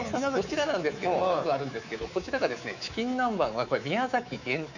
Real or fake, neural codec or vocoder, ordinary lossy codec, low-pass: fake; codec, 24 kHz, 3.1 kbps, DualCodec; none; 7.2 kHz